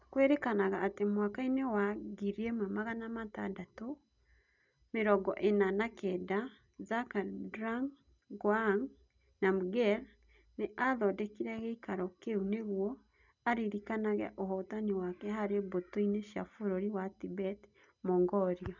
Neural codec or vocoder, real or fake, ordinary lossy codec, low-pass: none; real; none; 7.2 kHz